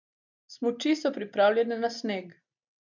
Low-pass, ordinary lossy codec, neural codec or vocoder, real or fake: 7.2 kHz; none; none; real